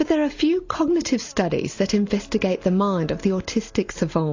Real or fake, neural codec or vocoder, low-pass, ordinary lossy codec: real; none; 7.2 kHz; AAC, 48 kbps